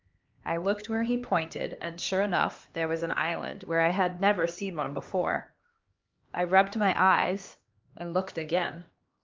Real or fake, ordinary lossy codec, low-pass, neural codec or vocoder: fake; Opus, 24 kbps; 7.2 kHz; codec, 16 kHz, 2 kbps, X-Codec, HuBERT features, trained on LibriSpeech